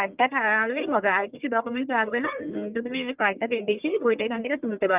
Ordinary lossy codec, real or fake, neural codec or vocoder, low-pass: Opus, 24 kbps; fake; codec, 44.1 kHz, 1.7 kbps, Pupu-Codec; 3.6 kHz